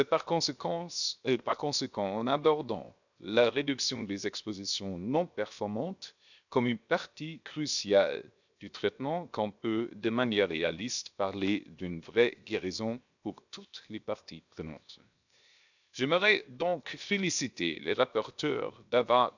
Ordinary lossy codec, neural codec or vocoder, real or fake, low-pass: none; codec, 16 kHz, 0.7 kbps, FocalCodec; fake; 7.2 kHz